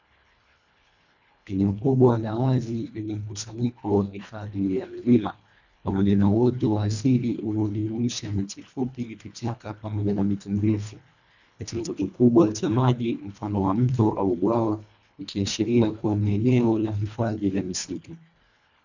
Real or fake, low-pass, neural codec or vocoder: fake; 7.2 kHz; codec, 24 kHz, 1.5 kbps, HILCodec